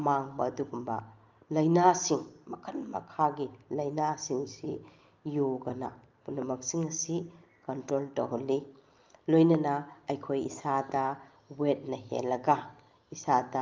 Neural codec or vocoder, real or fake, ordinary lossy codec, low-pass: none; real; Opus, 24 kbps; 7.2 kHz